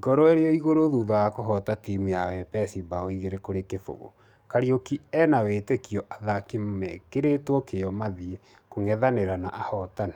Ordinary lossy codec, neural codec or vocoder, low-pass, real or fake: none; codec, 44.1 kHz, 7.8 kbps, DAC; 19.8 kHz; fake